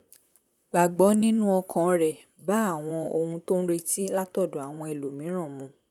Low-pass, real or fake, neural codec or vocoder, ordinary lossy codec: 19.8 kHz; fake; vocoder, 44.1 kHz, 128 mel bands, Pupu-Vocoder; none